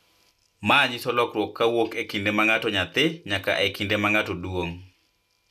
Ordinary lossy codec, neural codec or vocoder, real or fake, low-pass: none; none; real; 14.4 kHz